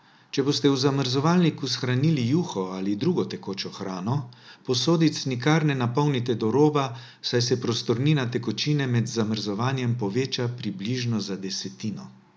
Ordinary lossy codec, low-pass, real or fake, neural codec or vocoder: none; none; real; none